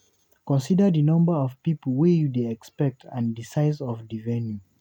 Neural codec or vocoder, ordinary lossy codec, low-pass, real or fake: none; none; 19.8 kHz; real